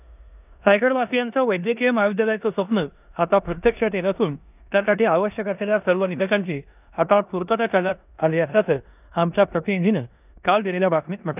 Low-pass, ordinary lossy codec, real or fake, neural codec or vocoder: 3.6 kHz; none; fake; codec, 16 kHz in and 24 kHz out, 0.9 kbps, LongCat-Audio-Codec, four codebook decoder